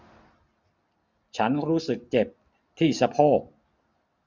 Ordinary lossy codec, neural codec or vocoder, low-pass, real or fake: none; none; 7.2 kHz; real